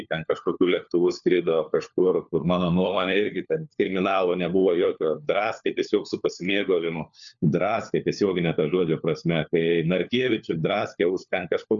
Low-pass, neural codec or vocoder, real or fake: 7.2 kHz; codec, 16 kHz, 4 kbps, FunCodec, trained on LibriTTS, 50 frames a second; fake